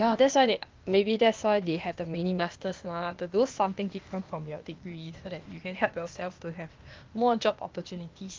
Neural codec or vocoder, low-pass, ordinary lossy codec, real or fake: codec, 16 kHz, 0.8 kbps, ZipCodec; 7.2 kHz; Opus, 24 kbps; fake